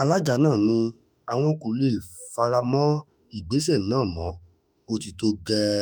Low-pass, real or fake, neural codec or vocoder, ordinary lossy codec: none; fake; autoencoder, 48 kHz, 32 numbers a frame, DAC-VAE, trained on Japanese speech; none